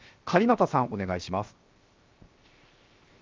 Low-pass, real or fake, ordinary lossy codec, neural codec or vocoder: 7.2 kHz; fake; Opus, 24 kbps; codec, 16 kHz, 0.7 kbps, FocalCodec